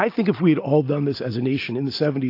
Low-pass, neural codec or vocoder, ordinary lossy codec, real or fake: 5.4 kHz; none; AAC, 32 kbps; real